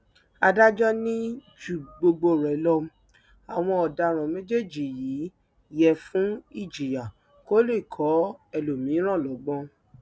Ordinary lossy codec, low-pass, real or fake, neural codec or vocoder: none; none; real; none